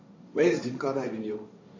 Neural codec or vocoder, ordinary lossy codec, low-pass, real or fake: codec, 16 kHz, 1.1 kbps, Voila-Tokenizer; MP3, 32 kbps; 7.2 kHz; fake